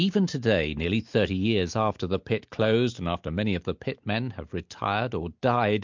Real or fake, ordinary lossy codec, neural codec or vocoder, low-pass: real; MP3, 64 kbps; none; 7.2 kHz